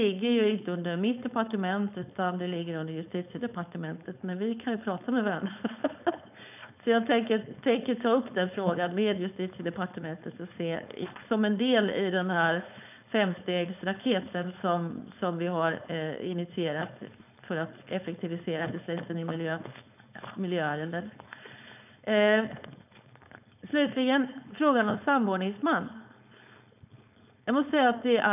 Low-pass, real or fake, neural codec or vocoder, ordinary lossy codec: 3.6 kHz; fake; codec, 16 kHz, 4.8 kbps, FACodec; none